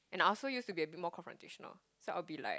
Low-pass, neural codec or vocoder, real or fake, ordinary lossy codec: none; none; real; none